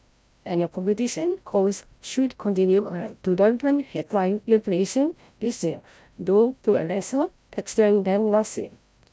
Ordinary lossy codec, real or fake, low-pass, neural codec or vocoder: none; fake; none; codec, 16 kHz, 0.5 kbps, FreqCodec, larger model